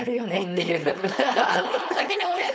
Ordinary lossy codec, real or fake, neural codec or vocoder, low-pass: none; fake; codec, 16 kHz, 4.8 kbps, FACodec; none